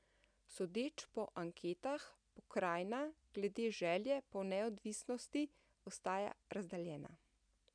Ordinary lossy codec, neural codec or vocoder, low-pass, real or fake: none; none; 9.9 kHz; real